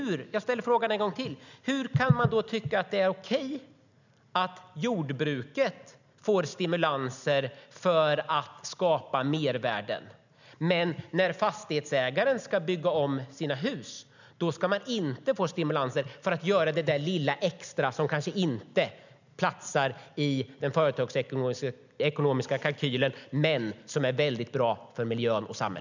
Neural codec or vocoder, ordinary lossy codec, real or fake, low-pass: none; none; real; 7.2 kHz